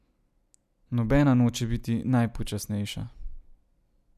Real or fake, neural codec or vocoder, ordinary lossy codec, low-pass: real; none; none; 14.4 kHz